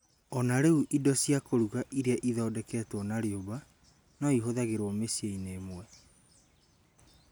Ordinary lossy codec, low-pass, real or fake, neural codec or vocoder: none; none; real; none